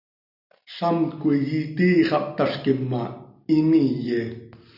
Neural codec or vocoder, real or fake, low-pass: none; real; 5.4 kHz